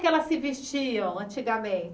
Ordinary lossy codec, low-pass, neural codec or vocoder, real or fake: none; none; none; real